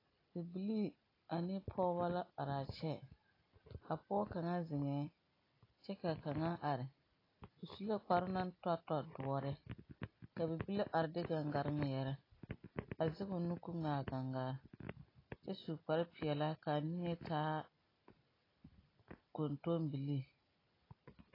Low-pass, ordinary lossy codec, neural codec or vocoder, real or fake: 5.4 kHz; AAC, 24 kbps; none; real